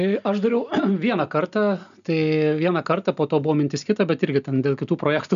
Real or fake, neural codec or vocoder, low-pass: real; none; 7.2 kHz